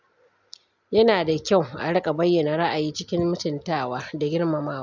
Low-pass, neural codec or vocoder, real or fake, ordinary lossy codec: 7.2 kHz; none; real; none